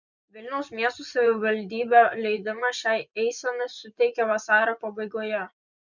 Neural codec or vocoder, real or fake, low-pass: vocoder, 24 kHz, 100 mel bands, Vocos; fake; 7.2 kHz